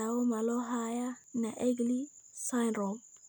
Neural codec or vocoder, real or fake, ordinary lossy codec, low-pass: none; real; none; none